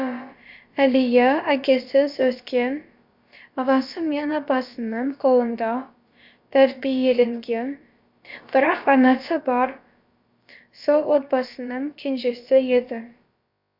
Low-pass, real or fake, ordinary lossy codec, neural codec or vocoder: 5.4 kHz; fake; MP3, 48 kbps; codec, 16 kHz, about 1 kbps, DyCAST, with the encoder's durations